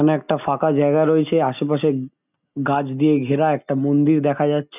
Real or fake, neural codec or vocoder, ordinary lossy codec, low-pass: real; none; none; 3.6 kHz